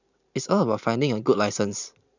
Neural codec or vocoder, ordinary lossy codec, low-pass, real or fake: vocoder, 44.1 kHz, 128 mel bands every 512 samples, BigVGAN v2; none; 7.2 kHz; fake